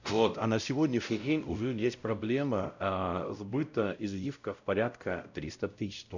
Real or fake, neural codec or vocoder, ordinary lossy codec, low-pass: fake; codec, 16 kHz, 0.5 kbps, X-Codec, WavLM features, trained on Multilingual LibriSpeech; none; 7.2 kHz